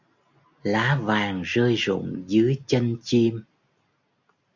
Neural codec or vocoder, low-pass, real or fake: none; 7.2 kHz; real